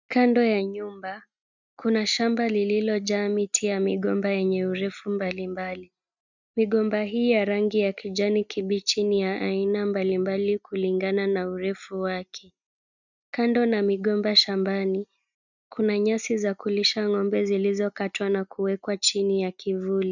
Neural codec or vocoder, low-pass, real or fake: none; 7.2 kHz; real